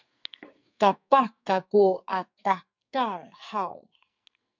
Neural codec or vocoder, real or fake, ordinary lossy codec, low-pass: codec, 44.1 kHz, 2.6 kbps, SNAC; fake; MP3, 64 kbps; 7.2 kHz